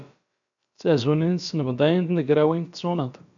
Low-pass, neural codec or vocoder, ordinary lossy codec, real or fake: 7.2 kHz; codec, 16 kHz, about 1 kbps, DyCAST, with the encoder's durations; AAC, 64 kbps; fake